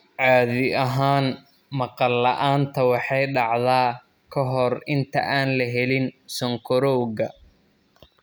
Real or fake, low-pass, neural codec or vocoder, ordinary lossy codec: real; none; none; none